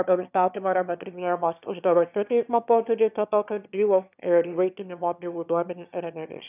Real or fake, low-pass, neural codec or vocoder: fake; 3.6 kHz; autoencoder, 22.05 kHz, a latent of 192 numbers a frame, VITS, trained on one speaker